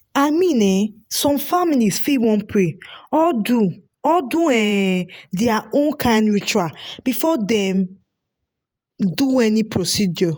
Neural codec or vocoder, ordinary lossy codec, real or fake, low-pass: vocoder, 48 kHz, 128 mel bands, Vocos; none; fake; none